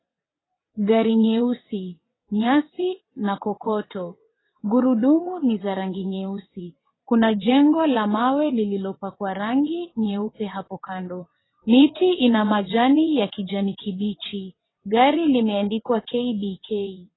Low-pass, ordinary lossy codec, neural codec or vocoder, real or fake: 7.2 kHz; AAC, 16 kbps; vocoder, 22.05 kHz, 80 mel bands, WaveNeXt; fake